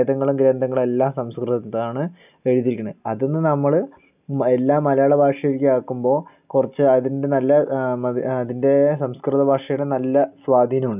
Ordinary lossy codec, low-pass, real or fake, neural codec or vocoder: none; 3.6 kHz; real; none